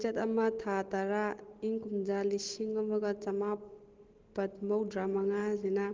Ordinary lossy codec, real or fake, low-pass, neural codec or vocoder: Opus, 16 kbps; real; 7.2 kHz; none